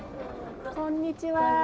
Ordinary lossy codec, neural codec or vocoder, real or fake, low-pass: none; none; real; none